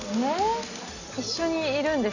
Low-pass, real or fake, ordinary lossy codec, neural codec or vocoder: 7.2 kHz; real; none; none